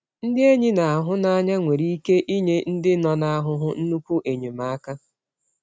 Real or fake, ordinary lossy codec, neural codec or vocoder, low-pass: real; none; none; none